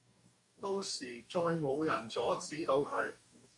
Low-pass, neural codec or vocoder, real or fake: 10.8 kHz; codec, 44.1 kHz, 2.6 kbps, DAC; fake